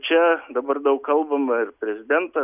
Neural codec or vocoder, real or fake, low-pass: none; real; 3.6 kHz